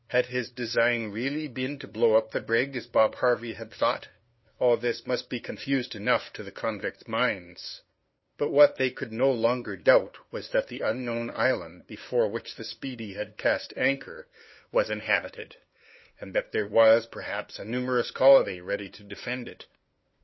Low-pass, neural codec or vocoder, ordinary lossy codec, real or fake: 7.2 kHz; codec, 16 kHz, 2 kbps, FunCodec, trained on LibriTTS, 25 frames a second; MP3, 24 kbps; fake